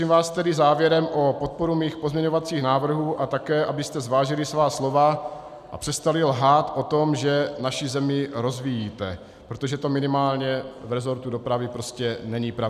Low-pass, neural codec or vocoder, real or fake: 14.4 kHz; none; real